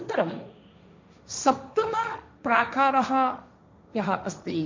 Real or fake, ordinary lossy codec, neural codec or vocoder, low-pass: fake; MP3, 64 kbps; codec, 16 kHz, 1.1 kbps, Voila-Tokenizer; 7.2 kHz